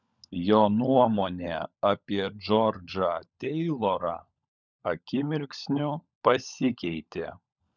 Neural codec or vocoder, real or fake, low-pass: codec, 16 kHz, 16 kbps, FunCodec, trained on LibriTTS, 50 frames a second; fake; 7.2 kHz